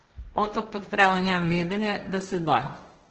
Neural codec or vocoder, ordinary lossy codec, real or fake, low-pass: codec, 16 kHz, 1.1 kbps, Voila-Tokenizer; Opus, 16 kbps; fake; 7.2 kHz